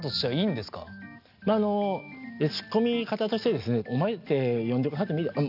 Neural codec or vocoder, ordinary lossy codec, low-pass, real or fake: none; none; 5.4 kHz; real